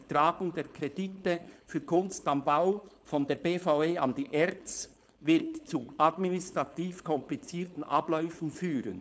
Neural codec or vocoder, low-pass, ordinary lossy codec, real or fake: codec, 16 kHz, 4.8 kbps, FACodec; none; none; fake